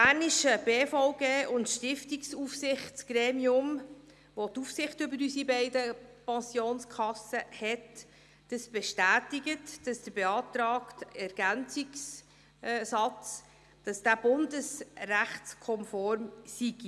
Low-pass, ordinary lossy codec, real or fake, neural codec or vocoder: none; none; real; none